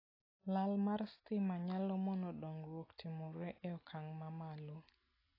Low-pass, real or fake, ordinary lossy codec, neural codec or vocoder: 5.4 kHz; real; AAC, 24 kbps; none